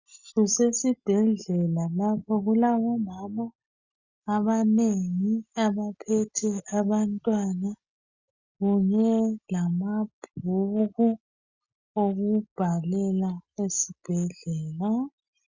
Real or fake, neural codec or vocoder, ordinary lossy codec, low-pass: real; none; Opus, 64 kbps; 7.2 kHz